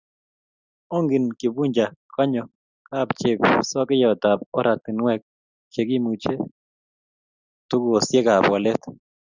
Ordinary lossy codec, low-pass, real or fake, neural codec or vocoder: Opus, 64 kbps; 7.2 kHz; real; none